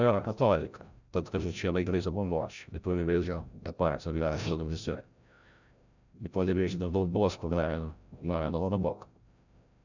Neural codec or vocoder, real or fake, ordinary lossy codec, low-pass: codec, 16 kHz, 0.5 kbps, FreqCodec, larger model; fake; none; 7.2 kHz